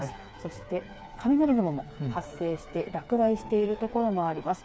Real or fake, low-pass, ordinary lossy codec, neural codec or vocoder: fake; none; none; codec, 16 kHz, 4 kbps, FreqCodec, smaller model